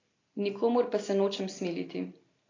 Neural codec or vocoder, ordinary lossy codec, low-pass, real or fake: none; AAC, 32 kbps; 7.2 kHz; real